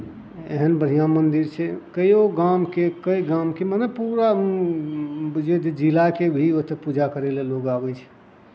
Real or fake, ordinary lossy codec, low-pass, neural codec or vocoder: real; none; none; none